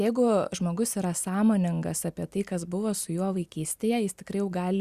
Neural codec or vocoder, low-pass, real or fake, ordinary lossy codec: none; 14.4 kHz; real; Opus, 64 kbps